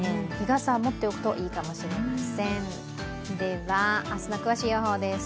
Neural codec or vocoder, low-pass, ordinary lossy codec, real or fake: none; none; none; real